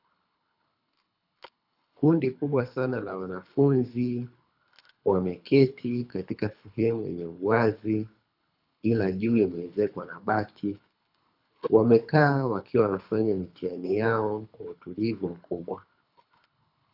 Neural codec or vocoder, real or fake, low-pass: codec, 24 kHz, 3 kbps, HILCodec; fake; 5.4 kHz